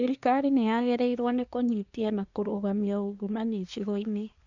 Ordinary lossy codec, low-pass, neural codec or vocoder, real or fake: none; 7.2 kHz; codec, 24 kHz, 1 kbps, SNAC; fake